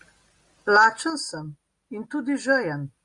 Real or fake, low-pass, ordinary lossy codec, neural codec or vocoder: real; 10.8 kHz; Opus, 64 kbps; none